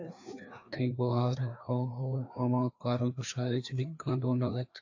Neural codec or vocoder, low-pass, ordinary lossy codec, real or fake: codec, 16 kHz, 2 kbps, FreqCodec, larger model; 7.2 kHz; AAC, 48 kbps; fake